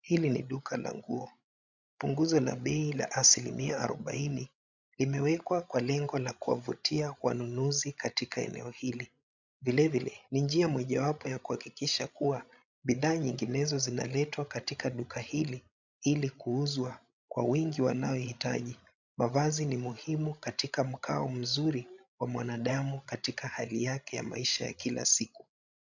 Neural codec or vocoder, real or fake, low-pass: codec, 16 kHz, 16 kbps, FreqCodec, larger model; fake; 7.2 kHz